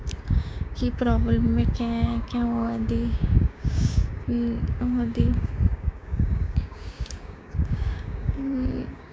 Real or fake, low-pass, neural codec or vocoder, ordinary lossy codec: fake; none; codec, 16 kHz, 6 kbps, DAC; none